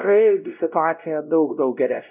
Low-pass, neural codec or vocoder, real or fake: 3.6 kHz; codec, 16 kHz, 0.5 kbps, X-Codec, WavLM features, trained on Multilingual LibriSpeech; fake